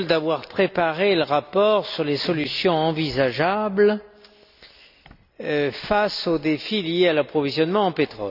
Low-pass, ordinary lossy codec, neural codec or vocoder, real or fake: 5.4 kHz; none; none; real